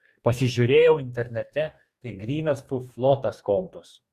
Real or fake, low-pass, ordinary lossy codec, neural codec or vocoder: fake; 14.4 kHz; Opus, 64 kbps; codec, 44.1 kHz, 2.6 kbps, DAC